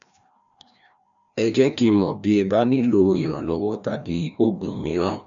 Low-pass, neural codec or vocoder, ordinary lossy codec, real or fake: 7.2 kHz; codec, 16 kHz, 1 kbps, FreqCodec, larger model; none; fake